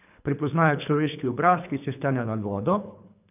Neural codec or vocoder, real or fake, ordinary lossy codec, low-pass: codec, 24 kHz, 3 kbps, HILCodec; fake; none; 3.6 kHz